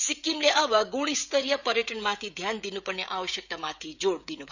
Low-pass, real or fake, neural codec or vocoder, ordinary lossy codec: 7.2 kHz; fake; codec, 16 kHz, 16 kbps, FreqCodec, smaller model; none